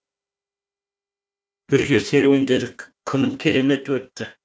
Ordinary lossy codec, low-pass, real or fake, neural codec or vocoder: none; none; fake; codec, 16 kHz, 1 kbps, FunCodec, trained on Chinese and English, 50 frames a second